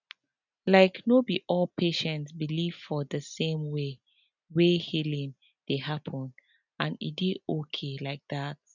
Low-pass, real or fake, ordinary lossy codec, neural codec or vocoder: 7.2 kHz; real; none; none